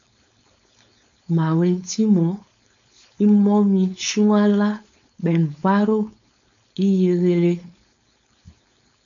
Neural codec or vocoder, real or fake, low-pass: codec, 16 kHz, 4.8 kbps, FACodec; fake; 7.2 kHz